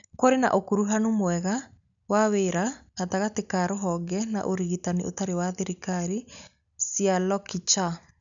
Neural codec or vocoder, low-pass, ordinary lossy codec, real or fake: none; 7.2 kHz; none; real